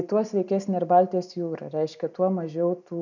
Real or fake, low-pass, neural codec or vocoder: real; 7.2 kHz; none